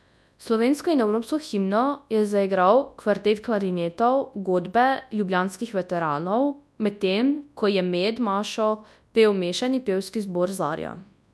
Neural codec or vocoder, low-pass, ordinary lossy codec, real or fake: codec, 24 kHz, 0.9 kbps, WavTokenizer, large speech release; none; none; fake